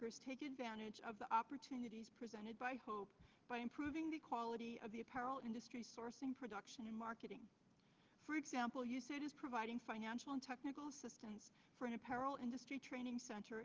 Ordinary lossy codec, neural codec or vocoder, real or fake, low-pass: Opus, 16 kbps; none; real; 7.2 kHz